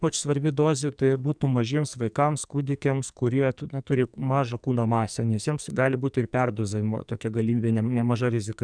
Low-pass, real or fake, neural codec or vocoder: 9.9 kHz; fake; codec, 44.1 kHz, 2.6 kbps, SNAC